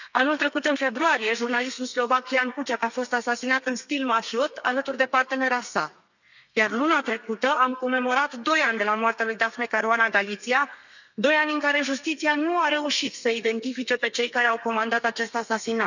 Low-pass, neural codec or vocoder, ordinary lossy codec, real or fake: 7.2 kHz; codec, 32 kHz, 1.9 kbps, SNAC; none; fake